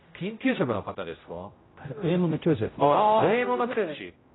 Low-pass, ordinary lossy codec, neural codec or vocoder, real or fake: 7.2 kHz; AAC, 16 kbps; codec, 16 kHz, 0.5 kbps, X-Codec, HuBERT features, trained on general audio; fake